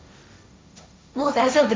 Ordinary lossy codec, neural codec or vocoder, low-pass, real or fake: none; codec, 16 kHz, 1.1 kbps, Voila-Tokenizer; none; fake